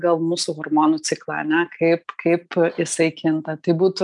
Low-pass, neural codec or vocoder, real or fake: 10.8 kHz; none; real